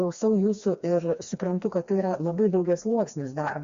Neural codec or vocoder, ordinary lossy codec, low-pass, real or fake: codec, 16 kHz, 2 kbps, FreqCodec, smaller model; Opus, 64 kbps; 7.2 kHz; fake